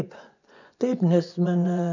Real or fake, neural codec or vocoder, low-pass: fake; vocoder, 24 kHz, 100 mel bands, Vocos; 7.2 kHz